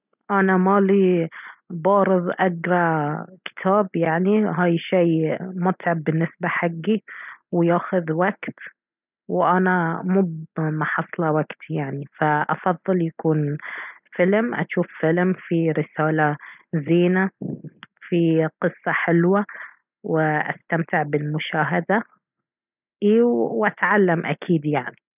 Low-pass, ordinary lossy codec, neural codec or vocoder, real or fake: 3.6 kHz; none; none; real